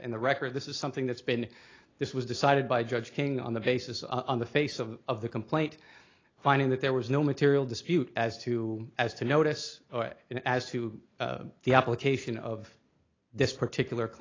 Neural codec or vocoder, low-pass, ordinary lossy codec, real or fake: none; 7.2 kHz; AAC, 32 kbps; real